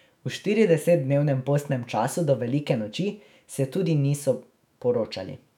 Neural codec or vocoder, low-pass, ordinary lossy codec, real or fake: autoencoder, 48 kHz, 128 numbers a frame, DAC-VAE, trained on Japanese speech; 19.8 kHz; none; fake